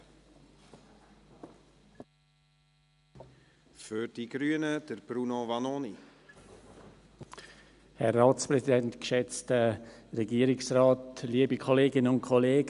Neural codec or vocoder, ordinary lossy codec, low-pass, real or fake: none; none; 10.8 kHz; real